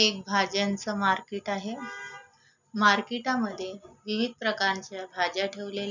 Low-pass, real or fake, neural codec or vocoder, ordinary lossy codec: 7.2 kHz; real; none; none